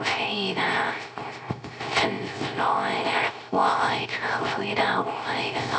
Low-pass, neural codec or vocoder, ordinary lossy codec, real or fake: none; codec, 16 kHz, 0.3 kbps, FocalCodec; none; fake